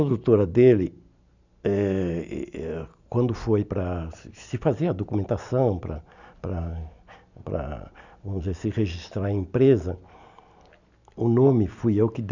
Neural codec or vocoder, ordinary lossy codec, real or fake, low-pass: none; none; real; 7.2 kHz